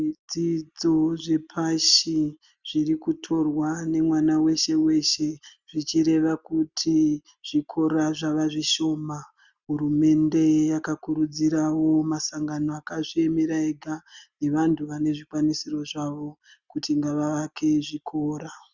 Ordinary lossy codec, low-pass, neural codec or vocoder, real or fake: Opus, 64 kbps; 7.2 kHz; none; real